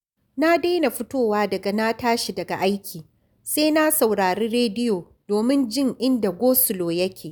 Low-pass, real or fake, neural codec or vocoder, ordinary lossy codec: none; real; none; none